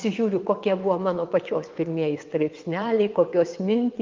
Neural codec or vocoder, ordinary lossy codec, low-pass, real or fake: vocoder, 22.05 kHz, 80 mel bands, WaveNeXt; Opus, 24 kbps; 7.2 kHz; fake